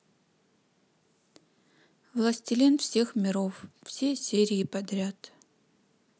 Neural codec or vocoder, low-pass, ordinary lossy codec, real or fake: none; none; none; real